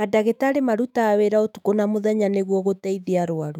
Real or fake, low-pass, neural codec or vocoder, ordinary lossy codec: fake; 19.8 kHz; autoencoder, 48 kHz, 128 numbers a frame, DAC-VAE, trained on Japanese speech; none